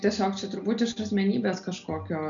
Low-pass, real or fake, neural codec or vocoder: 7.2 kHz; real; none